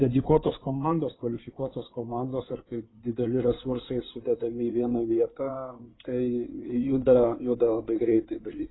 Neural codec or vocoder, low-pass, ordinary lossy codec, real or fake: codec, 16 kHz in and 24 kHz out, 2.2 kbps, FireRedTTS-2 codec; 7.2 kHz; AAC, 16 kbps; fake